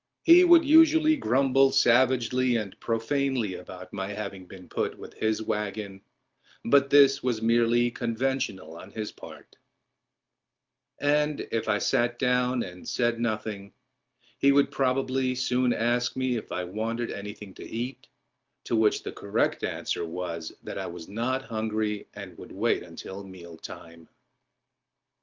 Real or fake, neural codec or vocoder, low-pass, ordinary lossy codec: real; none; 7.2 kHz; Opus, 32 kbps